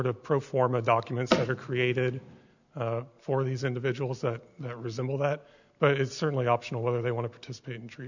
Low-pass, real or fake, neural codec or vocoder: 7.2 kHz; real; none